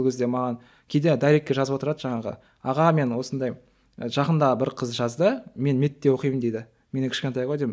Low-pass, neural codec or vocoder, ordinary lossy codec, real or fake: none; none; none; real